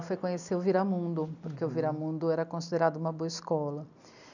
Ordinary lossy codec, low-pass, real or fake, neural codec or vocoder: none; 7.2 kHz; real; none